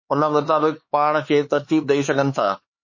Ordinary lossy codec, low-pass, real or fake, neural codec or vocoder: MP3, 32 kbps; 7.2 kHz; fake; codec, 16 kHz, 4 kbps, X-Codec, HuBERT features, trained on LibriSpeech